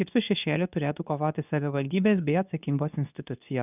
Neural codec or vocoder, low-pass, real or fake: codec, 24 kHz, 0.9 kbps, WavTokenizer, medium speech release version 2; 3.6 kHz; fake